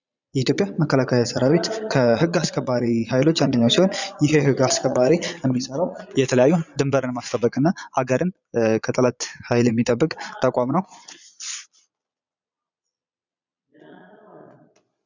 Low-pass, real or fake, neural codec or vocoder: 7.2 kHz; fake; vocoder, 22.05 kHz, 80 mel bands, Vocos